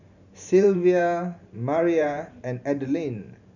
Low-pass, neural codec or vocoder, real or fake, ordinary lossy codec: 7.2 kHz; vocoder, 44.1 kHz, 128 mel bands every 512 samples, BigVGAN v2; fake; none